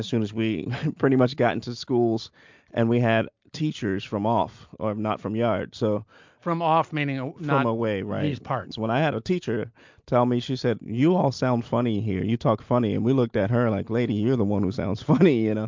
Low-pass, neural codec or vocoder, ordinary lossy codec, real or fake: 7.2 kHz; none; MP3, 64 kbps; real